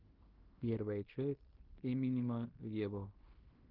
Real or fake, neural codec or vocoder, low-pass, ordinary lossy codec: fake; codec, 16 kHz in and 24 kHz out, 0.9 kbps, LongCat-Audio-Codec, fine tuned four codebook decoder; 5.4 kHz; Opus, 16 kbps